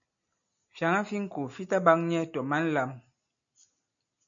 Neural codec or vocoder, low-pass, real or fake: none; 7.2 kHz; real